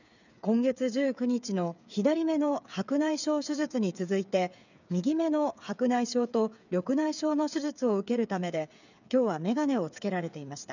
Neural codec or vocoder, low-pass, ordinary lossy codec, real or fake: codec, 16 kHz, 8 kbps, FreqCodec, smaller model; 7.2 kHz; none; fake